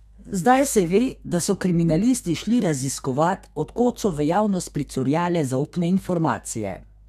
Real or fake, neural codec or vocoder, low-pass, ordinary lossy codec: fake; codec, 32 kHz, 1.9 kbps, SNAC; 14.4 kHz; none